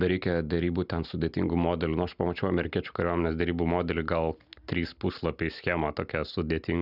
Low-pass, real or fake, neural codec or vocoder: 5.4 kHz; real; none